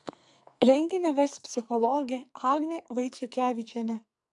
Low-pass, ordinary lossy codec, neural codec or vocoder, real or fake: 10.8 kHz; AAC, 64 kbps; codec, 44.1 kHz, 2.6 kbps, SNAC; fake